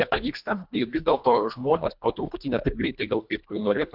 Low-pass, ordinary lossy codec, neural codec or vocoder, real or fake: 5.4 kHz; Opus, 64 kbps; codec, 24 kHz, 1.5 kbps, HILCodec; fake